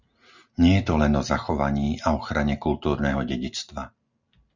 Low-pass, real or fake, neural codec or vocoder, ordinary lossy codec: 7.2 kHz; real; none; Opus, 64 kbps